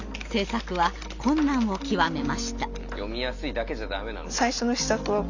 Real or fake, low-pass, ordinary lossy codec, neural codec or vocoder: real; 7.2 kHz; none; none